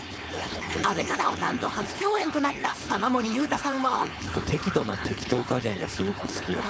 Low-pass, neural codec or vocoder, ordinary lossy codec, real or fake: none; codec, 16 kHz, 4.8 kbps, FACodec; none; fake